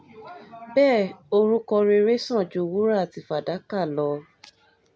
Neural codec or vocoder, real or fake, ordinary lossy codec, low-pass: none; real; none; none